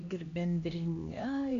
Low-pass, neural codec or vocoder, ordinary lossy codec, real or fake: 7.2 kHz; codec, 16 kHz, 1 kbps, X-Codec, HuBERT features, trained on LibriSpeech; Opus, 64 kbps; fake